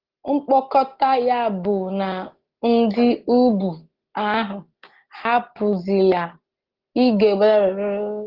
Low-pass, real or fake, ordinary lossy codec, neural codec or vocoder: 5.4 kHz; real; Opus, 16 kbps; none